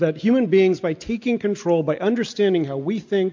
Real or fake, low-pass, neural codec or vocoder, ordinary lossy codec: real; 7.2 kHz; none; MP3, 48 kbps